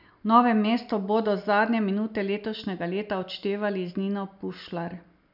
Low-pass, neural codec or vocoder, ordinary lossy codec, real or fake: 5.4 kHz; none; none; real